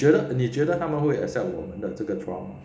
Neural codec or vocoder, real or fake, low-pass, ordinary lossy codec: none; real; none; none